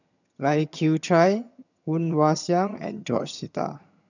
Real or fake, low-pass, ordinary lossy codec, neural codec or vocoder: fake; 7.2 kHz; none; vocoder, 22.05 kHz, 80 mel bands, HiFi-GAN